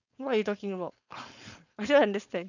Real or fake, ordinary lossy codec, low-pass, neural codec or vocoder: fake; none; 7.2 kHz; codec, 16 kHz, 4.8 kbps, FACodec